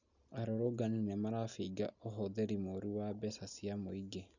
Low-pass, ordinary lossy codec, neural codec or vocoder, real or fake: 7.2 kHz; none; none; real